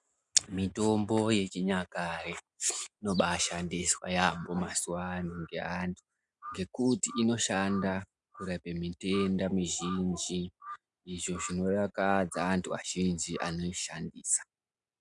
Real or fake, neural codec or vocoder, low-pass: real; none; 10.8 kHz